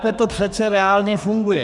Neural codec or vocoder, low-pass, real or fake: codec, 32 kHz, 1.9 kbps, SNAC; 10.8 kHz; fake